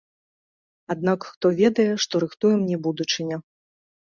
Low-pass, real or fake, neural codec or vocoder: 7.2 kHz; real; none